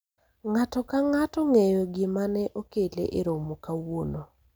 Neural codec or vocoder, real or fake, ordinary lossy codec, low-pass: none; real; none; none